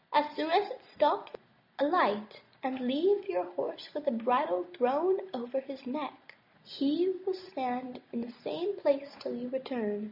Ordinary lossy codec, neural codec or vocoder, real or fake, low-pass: MP3, 48 kbps; none; real; 5.4 kHz